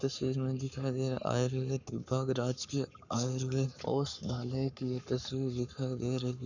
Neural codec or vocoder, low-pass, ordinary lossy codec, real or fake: codec, 44.1 kHz, 7.8 kbps, Pupu-Codec; 7.2 kHz; none; fake